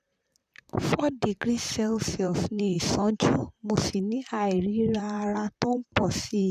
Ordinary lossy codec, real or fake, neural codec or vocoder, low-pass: none; fake; vocoder, 44.1 kHz, 128 mel bands, Pupu-Vocoder; 14.4 kHz